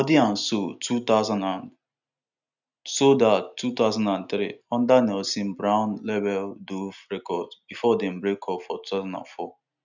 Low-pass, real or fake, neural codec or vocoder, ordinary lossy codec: 7.2 kHz; real; none; none